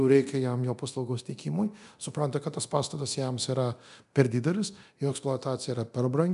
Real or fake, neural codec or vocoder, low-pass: fake; codec, 24 kHz, 0.9 kbps, DualCodec; 10.8 kHz